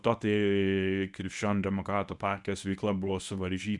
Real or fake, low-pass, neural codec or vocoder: fake; 10.8 kHz; codec, 24 kHz, 0.9 kbps, WavTokenizer, medium speech release version 1